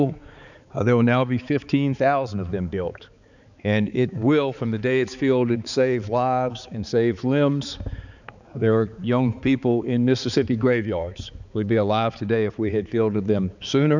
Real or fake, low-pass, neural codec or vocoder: fake; 7.2 kHz; codec, 16 kHz, 4 kbps, X-Codec, HuBERT features, trained on balanced general audio